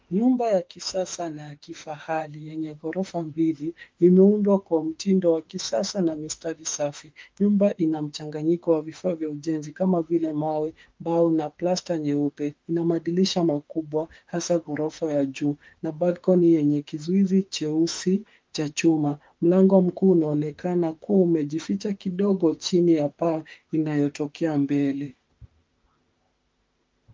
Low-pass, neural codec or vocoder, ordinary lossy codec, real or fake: 7.2 kHz; autoencoder, 48 kHz, 32 numbers a frame, DAC-VAE, trained on Japanese speech; Opus, 32 kbps; fake